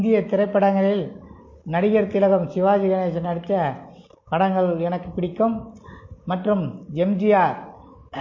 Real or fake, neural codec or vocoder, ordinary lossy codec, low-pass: real; none; MP3, 32 kbps; 7.2 kHz